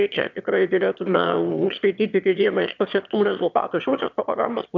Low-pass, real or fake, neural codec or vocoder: 7.2 kHz; fake; autoencoder, 22.05 kHz, a latent of 192 numbers a frame, VITS, trained on one speaker